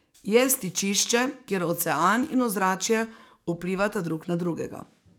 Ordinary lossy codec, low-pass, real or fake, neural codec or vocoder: none; none; fake; codec, 44.1 kHz, 7.8 kbps, DAC